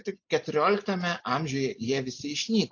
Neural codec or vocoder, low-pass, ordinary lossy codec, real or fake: none; 7.2 kHz; AAC, 48 kbps; real